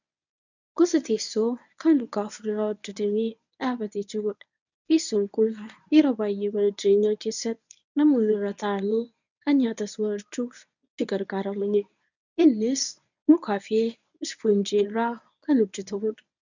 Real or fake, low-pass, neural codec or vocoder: fake; 7.2 kHz; codec, 24 kHz, 0.9 kbps, WavTokenizer, medium speech release version 1